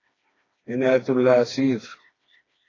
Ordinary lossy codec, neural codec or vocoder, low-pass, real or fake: AAC, 32 kbps; codec, 16 kHz, 2 kbps, FreqCodec, smaller model; 7.2 kHz; fake